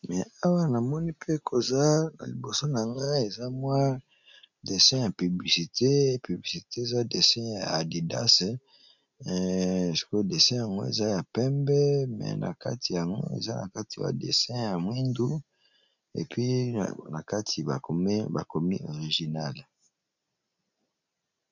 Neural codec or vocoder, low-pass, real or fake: none; 7.2 kHz; real